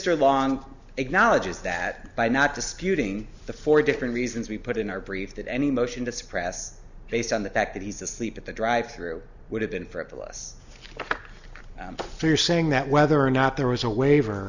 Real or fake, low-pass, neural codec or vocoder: real; 7.2 kHz; none